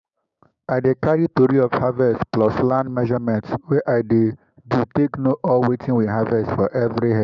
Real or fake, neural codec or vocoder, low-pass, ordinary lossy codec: fake; codec, 16 kHz, 6 kbps, DAC; 7.2 kHz; none